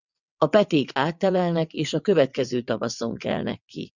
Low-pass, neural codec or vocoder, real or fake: 7.2 kHz; vocoder, 22.05 kHz, 80 mel bands, WaveNeXt; fake